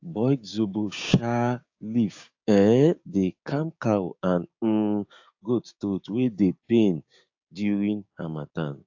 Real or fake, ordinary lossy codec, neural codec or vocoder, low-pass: fake; AAC, 48 kbps; codec, 16 kHz, 6 kbps, DAC; 7.2 kHz